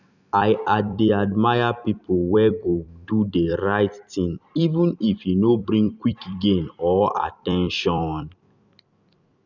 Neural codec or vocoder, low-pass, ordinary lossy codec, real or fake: vocoder, 44.1 kHz, 128 mel bands every 256 samples, BigVGAN v2; 7.2 kHz; none; fake